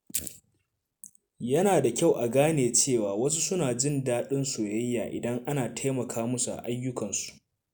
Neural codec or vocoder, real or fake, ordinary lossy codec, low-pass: none; real; none; none